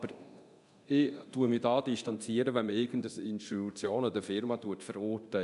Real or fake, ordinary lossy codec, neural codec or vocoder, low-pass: fake; MP3, 96 kbps; codec, 24 kHz, 0.9 kbps, DualCodec; 10.8 kHz